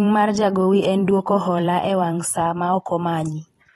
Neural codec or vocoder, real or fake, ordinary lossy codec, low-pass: vocoder, 44.1 kHz, 128 mel bands every 512 samples, BigVGAN v2; fake; AAC, 32 kbps; 19.8 kHz